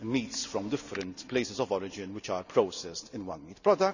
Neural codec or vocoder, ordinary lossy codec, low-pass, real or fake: none; none; 7.2 kHz; real